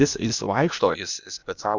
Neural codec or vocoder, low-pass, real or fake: codec, 16 kHz, 0.8 kbps, ZipCodec; 7.2 kHz; fake